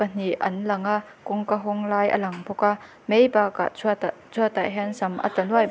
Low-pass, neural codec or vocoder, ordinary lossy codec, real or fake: none; none; none; real